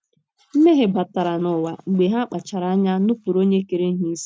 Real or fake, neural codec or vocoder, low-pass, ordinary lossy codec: real; none; none; none